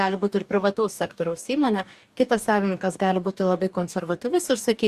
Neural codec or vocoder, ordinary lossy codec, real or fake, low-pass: codec, 44.1 kHz, 2.6 kbps, DAC; Opus, 64 kbps; fake; 14.4 kHz